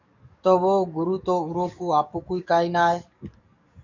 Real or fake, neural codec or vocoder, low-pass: fake; codec, 44.1 kHz, 7.8 kbps, DAC; 7.2 kHz